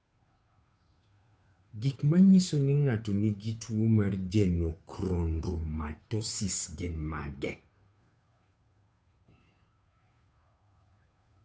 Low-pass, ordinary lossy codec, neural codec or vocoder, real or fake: none; none; codec, 16 kHz, 2 kbps, FunCodec, trained on Chinese and English, 25 frames a second; fake